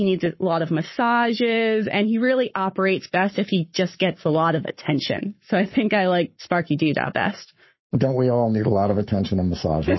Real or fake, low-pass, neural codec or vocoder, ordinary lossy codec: fake; 7.2 kHz; codec, 44.1 kHz, 7.8 kbps, Pupu-Codec; MP3, 24 kbps